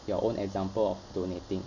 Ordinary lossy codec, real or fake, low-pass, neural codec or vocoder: none; real; 7.2 kHz; none